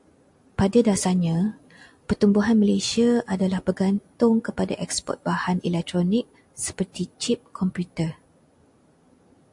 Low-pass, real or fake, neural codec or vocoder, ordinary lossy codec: 10.8 kHz; real; none; AAC, 64 kbps